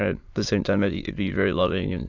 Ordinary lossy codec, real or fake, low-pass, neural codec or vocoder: AAC, 48 kbps; fake; 7.2 kHz; autoencoder, 22.05 kHz, a latent of 192 numbers a frame, VITS, trained on many speakers